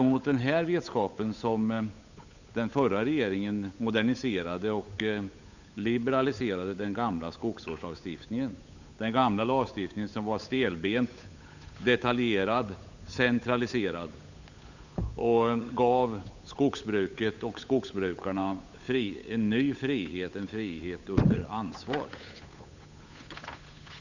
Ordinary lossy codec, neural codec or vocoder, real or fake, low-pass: none; codec, 16 kHz, 8 kbps, FunCodec, trained on Chinese and English, 25 frames a second; fake; 7.2 kHz